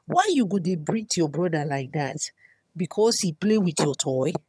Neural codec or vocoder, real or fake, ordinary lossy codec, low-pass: vocoder, 22.05 kHz, 80 mel bands, HiFi-GAN; fake; none; none